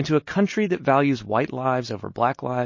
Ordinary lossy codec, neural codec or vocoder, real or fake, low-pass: MP3, 32 kbps; none; real; 7.2 kHz